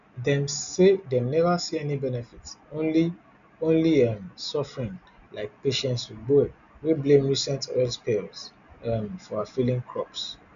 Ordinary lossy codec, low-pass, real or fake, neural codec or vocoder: none; 7.2 kHz; real; none